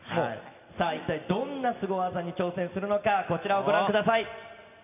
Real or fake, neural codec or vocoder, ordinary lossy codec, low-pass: real; none; none; 3.6 kHz